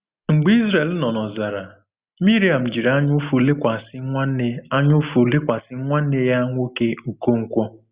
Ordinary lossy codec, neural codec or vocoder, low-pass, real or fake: Opus, 64 kbps; none; 3.6 kHz; real